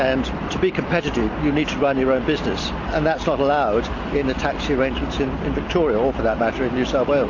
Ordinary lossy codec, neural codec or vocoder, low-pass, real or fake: AAC, 48 kbps; none; 7.2 kHz; real